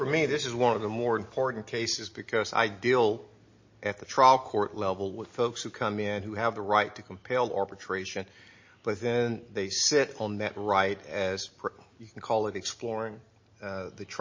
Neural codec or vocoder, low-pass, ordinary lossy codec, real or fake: none; 7.2 kHz; MP3, 32 kbps; real